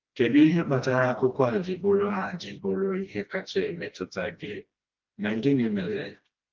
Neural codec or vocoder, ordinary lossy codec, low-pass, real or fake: codec, 16 kHz, 1 kbps, FreqCodec, smaller model; Opus, 24 kbps; 7.2 kHz; fake